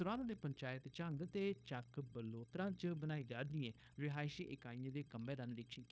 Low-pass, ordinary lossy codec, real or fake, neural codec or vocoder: none; none; fake; codec, 16 kHz, 0.9 kbps, LongCat-Audio-Codec